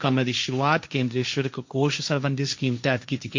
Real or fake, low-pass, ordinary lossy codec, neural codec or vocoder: fake; 7.2 kHz; MP3, 48 kbps; codec, 16 kHz, 1.1 kbps, Voila-Tokenizer